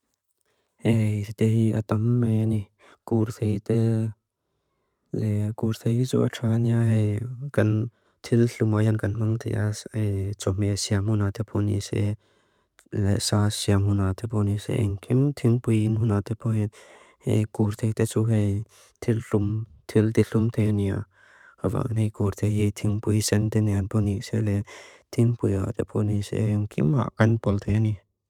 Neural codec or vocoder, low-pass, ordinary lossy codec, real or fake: vocoder, 44.1 kHz, 128 mel bands every 512 samples, BigVGAN v2; 19.8 kHz; none; fake